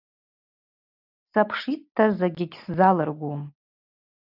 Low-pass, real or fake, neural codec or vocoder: 5.4 kHz; real; none